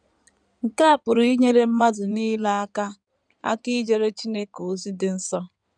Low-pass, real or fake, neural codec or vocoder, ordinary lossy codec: 9.9 kHz; fake; codec, 16 kHz in and 24 kHz out, 2.2 kbps, FireRedTTS-2 codec; none